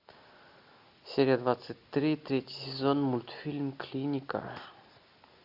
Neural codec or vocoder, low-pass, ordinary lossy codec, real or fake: none; 5.4 kHz; AAC, 48 kbps; real